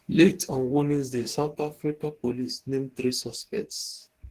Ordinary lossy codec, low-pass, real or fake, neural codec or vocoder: Opus, 16 kbps; 14.4 kHz; fake; codec, 44.1 kHz, 2.6 kbps, DAC